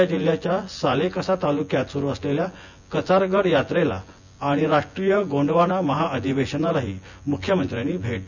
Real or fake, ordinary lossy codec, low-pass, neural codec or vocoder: fake; none; 7.2 kHz; vocoder, 24 kHz, 100 mel bands, Vocos